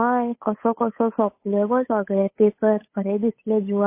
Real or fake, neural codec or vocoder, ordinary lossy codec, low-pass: fake; codec, 16 kHz, 8 kbps, FunCodec, trained on Chinese and English, 25 frames a second; MP3, 24 kbps; 3.6 kHz